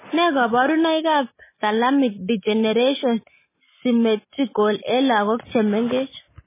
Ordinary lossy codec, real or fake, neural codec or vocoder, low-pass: MP3, 16 kbps; real; none; 3.6 kHz